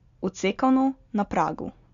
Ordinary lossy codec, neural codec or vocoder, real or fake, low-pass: none; none; real; 7.2 kHz